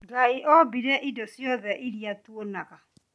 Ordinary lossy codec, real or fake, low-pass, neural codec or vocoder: none; real; none; none